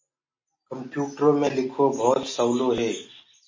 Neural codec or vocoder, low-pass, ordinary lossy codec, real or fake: none; 7.2 kHz; MP3, 32 kbps; real